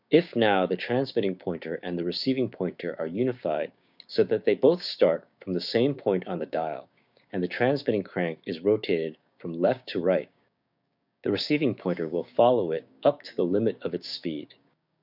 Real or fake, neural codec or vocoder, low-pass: fake; codec, 16 kHz, 6 kbps, DAC; 5.4 kHz